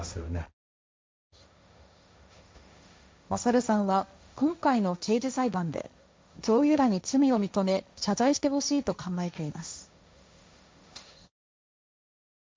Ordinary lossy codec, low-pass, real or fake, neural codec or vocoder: none; none; fake; codec, 16 kHz, 1.1 kbps, Voila-Tokenizer